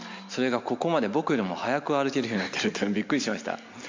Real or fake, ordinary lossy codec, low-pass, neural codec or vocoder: real; MP3, 48 kbps; 7.2 kHz; none